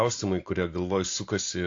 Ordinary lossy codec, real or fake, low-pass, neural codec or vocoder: AAC, 48 kbps; real; 7.2 kHz; none